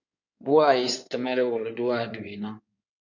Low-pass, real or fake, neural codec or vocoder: 7.2 kHz; fake; codec, 16 kHz in and 24 kHz out, 2.2 kbps, FireRedTTS-2 codec